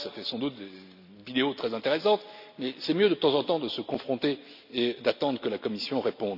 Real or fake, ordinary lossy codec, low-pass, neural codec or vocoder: real; none; 5.4 kHz; none